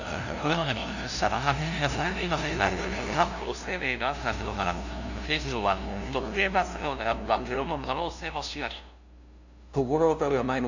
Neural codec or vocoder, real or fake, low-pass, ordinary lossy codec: codec, 16 kHz, 0.5 kbps, FunCodec, trained on LibriTTS, 25 frames a second; fake; 7.2 kHz; none